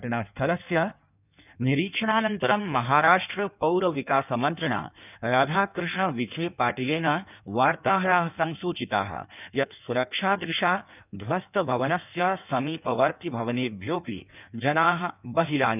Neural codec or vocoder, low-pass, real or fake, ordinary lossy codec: codec, 16 kHz in and 24 kHz out, 1.1 kbps, FireRedTTS-2 codec; 3.6 kHz; fake; none